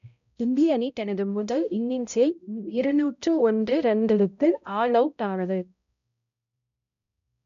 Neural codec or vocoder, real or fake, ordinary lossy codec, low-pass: codec, 16 kHz, 0.5 kbps, X-Codec, HuBERT features, trained on balanced general audio; fake; none; 7.2 kHz